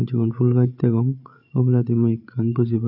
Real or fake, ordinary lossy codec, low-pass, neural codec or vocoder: fake; none; 5.4 kHz; codec, 16 kHz, 16 kbps, FreqCodec, larger model